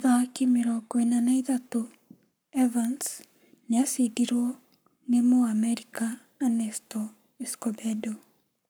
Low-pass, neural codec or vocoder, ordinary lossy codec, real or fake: none; codec, 44.1 kHz, 7.8 kbps, Pupu-Codec; none; fake